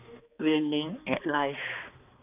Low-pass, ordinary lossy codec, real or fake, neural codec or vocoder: 3.6 kHz; none; fake; codec, 16 kHz, 2 kbps, X-Codec, HuBERT features, trained on balanced general audio